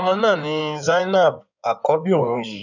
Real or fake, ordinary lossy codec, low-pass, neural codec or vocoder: fake; none; 7.2 kHz; codec, 16 kHz in and 24 kHz out, 2.2 kbps, FireRedTTS-2 codec